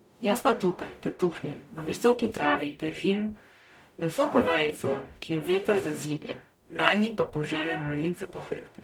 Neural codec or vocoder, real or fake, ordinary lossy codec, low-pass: codec, 44.1 kHz, 0.9 kbps, DAC; fake; none; 19.8 kHz